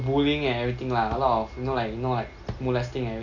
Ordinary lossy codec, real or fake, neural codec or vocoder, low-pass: none; real; none; 7.2 kHz